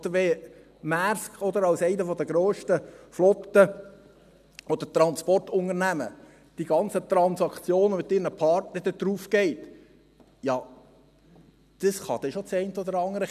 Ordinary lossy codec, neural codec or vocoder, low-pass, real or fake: none; none; 14.4 kHz; real